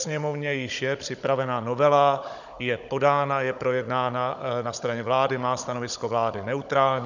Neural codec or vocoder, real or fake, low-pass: codec, 16 kHz, 16 kbps, FunCodec, trained on Chinese and English, 50 frames a second; fake; 7.2 kHz